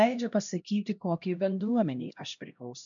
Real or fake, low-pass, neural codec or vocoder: fake; 7.2 kHz; codec, 16 kHz, 1 kbps, X-Codec, HuBERT features, trained on LibriSpeech